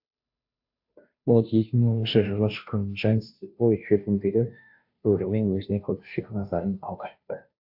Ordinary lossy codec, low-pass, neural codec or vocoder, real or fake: AAC, 48 kbps; 5.4 kHz; codec, 16 kHz, 0.5 kbps, FunCodec, trained on Chinese and English, 25 frames a second; fake